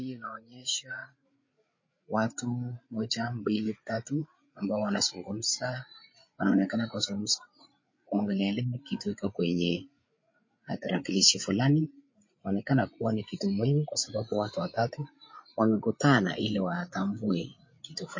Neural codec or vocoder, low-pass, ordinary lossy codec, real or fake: codec, 16 kHz, 8 kbps, FreqCodec, larger model; 7.2 kHz; MP3, 32 kbps; fake